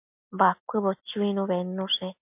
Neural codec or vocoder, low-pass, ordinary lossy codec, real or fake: none; 3.6 kHz; MP3, 32 kbps; real